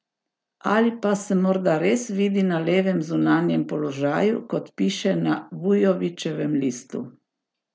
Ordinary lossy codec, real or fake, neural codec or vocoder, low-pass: none; real; none; none